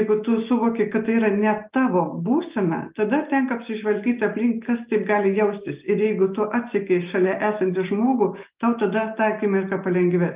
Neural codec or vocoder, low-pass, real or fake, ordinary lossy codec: none; 3.6 kHz; real; Opus, 32 kbps